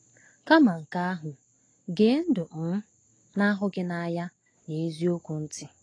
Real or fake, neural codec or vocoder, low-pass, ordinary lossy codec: real; none; 9.9 kHz; AAC, 32 kbps